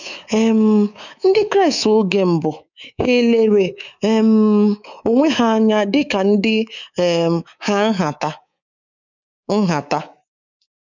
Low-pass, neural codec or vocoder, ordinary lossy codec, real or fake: 7.2 kHz; codec, 24 kHz, 3.1 kbps, DualCodec; none; fake